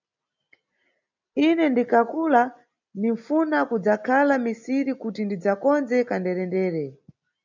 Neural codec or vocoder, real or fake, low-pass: none; real; 7.2 kHz